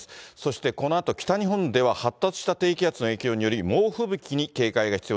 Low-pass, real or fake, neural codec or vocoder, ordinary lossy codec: none; real; none; none